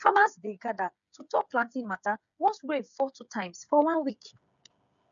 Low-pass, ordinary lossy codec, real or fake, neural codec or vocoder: 7.2 kHz; none; fake; codec, 16 kHz, 8 kbps, FreqCodec, smaller model